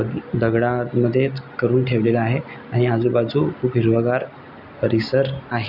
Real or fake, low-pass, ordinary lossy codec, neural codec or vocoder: real; 5.4 kHz; none; none